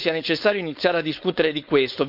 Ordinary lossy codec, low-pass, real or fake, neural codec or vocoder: none; 5.4 kHz; fake; codec, 16 kHz, 4.8 kbps, FACodec